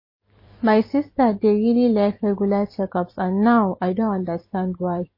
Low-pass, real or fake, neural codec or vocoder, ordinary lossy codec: 5.4 kHz; fake; vocoder, 44.1 kHz, 128 mel bands every 256 samples, BigVGAN v2; MP3, 24 kbps